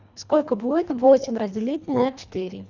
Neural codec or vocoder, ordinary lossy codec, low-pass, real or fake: codec, 24 kHz, 1.5 kbps, HILCodec; none; 7.2 kHz; fake